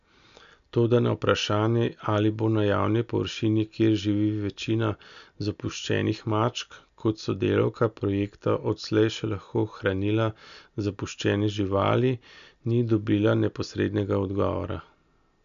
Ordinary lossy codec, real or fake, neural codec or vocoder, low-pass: none; real; none; 7.2 kHz